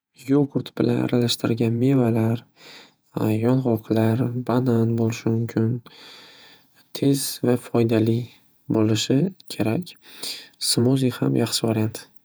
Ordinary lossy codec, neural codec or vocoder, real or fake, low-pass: none; vocoder, 48 kHz, 128 mel bands, Vocos; fake; none